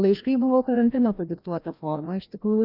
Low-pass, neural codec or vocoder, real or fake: 5.4 kHz; codec, 16 kHz, 1 kbps, FreqCodec, larger model; fake